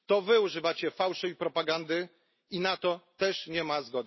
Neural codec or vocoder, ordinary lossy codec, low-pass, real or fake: none; MP3, 24 kbps; 7.2 kHz; real